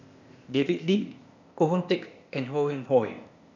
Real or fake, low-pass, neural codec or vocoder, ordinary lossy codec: fake; 7.2 kHz; codec, 16 kHz, 0.8 kbps, ZipCodec; none